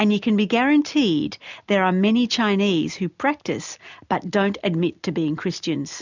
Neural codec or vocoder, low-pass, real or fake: none; 7.2 kHz; real